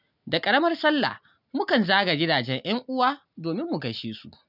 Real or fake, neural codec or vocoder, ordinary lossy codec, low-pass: real; none; none; 5.4 kHz